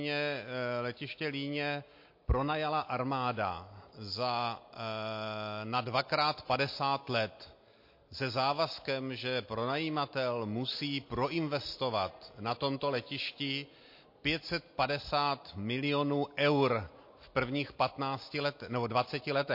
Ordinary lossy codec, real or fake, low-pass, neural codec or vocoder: MP3, 32 kbps; real; 5.4 kHz; none